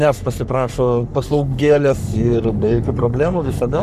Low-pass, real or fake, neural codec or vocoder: 14.4 kHz; fake; codec, 44.1 kHz, 3.4 kbps, Pupu-Codec